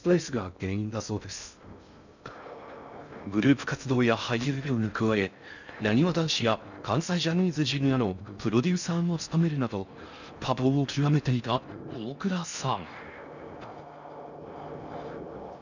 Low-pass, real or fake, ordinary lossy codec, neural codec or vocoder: 7.2 kHz; fake; none; codec, 16 kHz in and 24 kHz out, 0.6 kbps, FocalCodec, streaming, 2048 codes